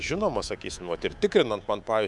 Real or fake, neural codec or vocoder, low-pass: fake; codec, 24 kHz, 3.1 kbps, DualCodec; 10.8 kHz